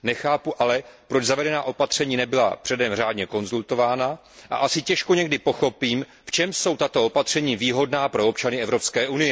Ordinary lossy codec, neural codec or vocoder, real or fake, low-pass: none; none; real; none